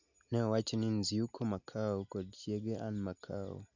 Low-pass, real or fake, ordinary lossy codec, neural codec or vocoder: 7.2 kHz; real; none; none